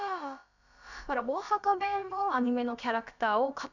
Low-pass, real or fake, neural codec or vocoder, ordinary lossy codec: 7.2 kHz; fake; codec, 16 kHz, about 1 kbps, DyCAST, with the encoder's durations; none